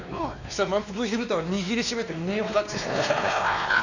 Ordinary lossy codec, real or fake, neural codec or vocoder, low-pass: none; fake; codec, 16 kHz, 2 kbps, X-Codec, WavLM features, trained on Multilingual LibriSpeech; 7.2 kHz